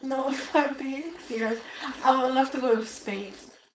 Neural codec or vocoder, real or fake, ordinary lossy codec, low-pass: codec, 16 kHz, 4.8 kbps, FACodec; fake; none; none